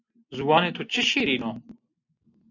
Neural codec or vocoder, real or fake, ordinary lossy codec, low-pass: none; real; AAC, 48 kbps; 7.2 kHz